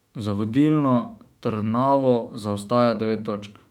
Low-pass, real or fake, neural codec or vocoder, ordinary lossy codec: 19.8 kHz; fake; autoencoder, 48 kHz, 32 numbers a frame, DAC-VAE, trained on Japanese speech; none